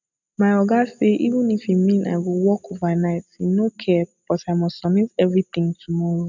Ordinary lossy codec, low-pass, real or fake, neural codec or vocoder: none; 7.2 kHz; real; none